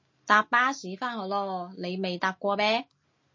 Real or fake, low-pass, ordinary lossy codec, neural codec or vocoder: real; 7.2 kHz; MP3, 32 kbps; none